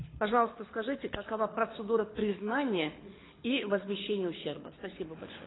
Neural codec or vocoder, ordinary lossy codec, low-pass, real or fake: codec, 24 kHz, 6 kbps, HILCodec; AAC, 16 kbps; 7.2 kHz; fake